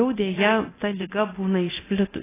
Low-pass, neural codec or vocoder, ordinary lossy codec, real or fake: 3.6 kHz; codec, 24 kHz, 0.9 kbps, DualCodec; AAC, 16 kbps; fake